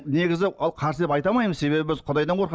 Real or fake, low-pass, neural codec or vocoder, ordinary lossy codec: real; none; none; none